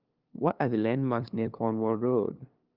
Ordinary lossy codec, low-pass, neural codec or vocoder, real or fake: Opus, 24 kbps; 5.4 kHz; codec, 16 kHz, 2 kbps, FunCodec, trained on LibriTTS, 25 frames a second; fake